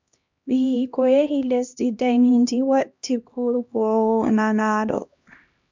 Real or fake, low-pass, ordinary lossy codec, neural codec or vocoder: fake; 7.2 kHz; MP3, 64 kbps; codec, 16 kHz, 1 kbps, X-Codec, HuBERT features, trained on LibriSpeech